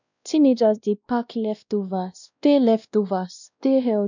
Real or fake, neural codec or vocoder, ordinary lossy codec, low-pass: fake; codec, 16 kHz, 1 kbps, X-Codec, WavLM features, trained on Multilingual LibriSpeech; none; 7.2 kHz